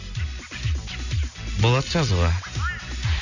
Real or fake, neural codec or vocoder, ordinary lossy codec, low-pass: real; none; none; 7.2 kHz